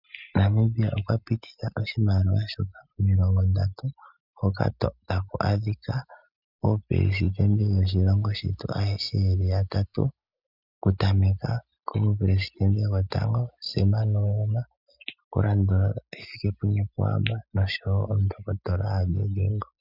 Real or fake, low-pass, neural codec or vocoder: real; 5.4 kHz; none